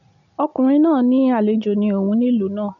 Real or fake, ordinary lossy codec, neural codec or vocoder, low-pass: real; none; none; 7.2 kHz